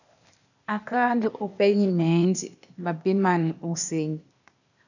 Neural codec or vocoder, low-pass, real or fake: codec, 16 kHz, 0.8 kbps, ZipCodec; 7.2 kHz; fake